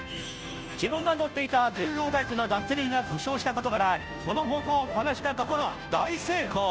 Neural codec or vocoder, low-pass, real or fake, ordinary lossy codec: codec, 16 kHz, 0.5 kbps, FunCodec, trained on Chinese and English, 25 frames a second; none; fake; none